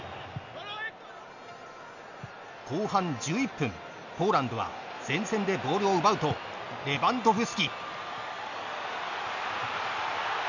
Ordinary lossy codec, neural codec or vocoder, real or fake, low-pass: none; none; real; 7.2 kHz